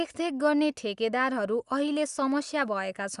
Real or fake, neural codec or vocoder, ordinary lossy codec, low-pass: real; none; Opus, 64 kbps; 10.8 kHz